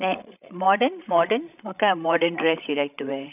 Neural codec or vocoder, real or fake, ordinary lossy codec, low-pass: codec, 16 kHz, 16 kbps, FreqCodec, larger model; fake; none; 3.6 kHz